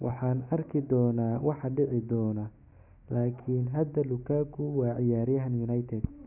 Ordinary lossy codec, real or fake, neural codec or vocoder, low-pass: none; real; none; 3.6 kHz